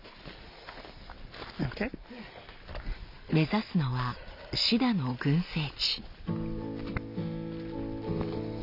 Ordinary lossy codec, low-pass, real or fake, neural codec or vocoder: none; 5.4 kHz; real; none